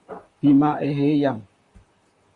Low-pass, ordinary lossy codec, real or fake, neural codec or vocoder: 10.8 kHz; Opus, 64 kbps; fake; vocoder, 44.1 kHz, 128 mel bands, Pupu-Vocoder